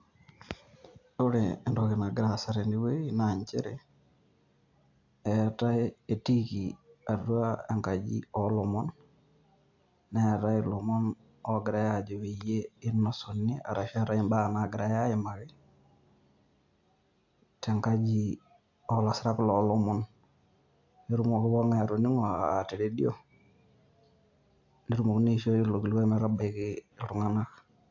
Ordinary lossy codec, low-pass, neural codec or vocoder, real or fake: AAC, 48 kbps; 7.2 kHz; none; real